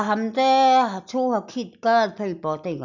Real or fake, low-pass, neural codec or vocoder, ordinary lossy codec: real; 7.2 kHz; none; none